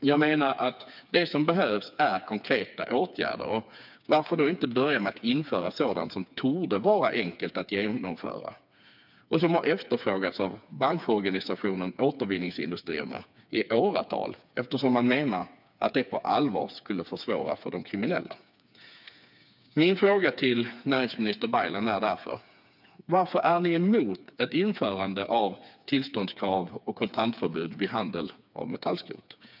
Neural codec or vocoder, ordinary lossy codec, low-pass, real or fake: codec, 16 kHz, 4 kbps, FreqCodec, smaller model; none; 5.4 kHz; fake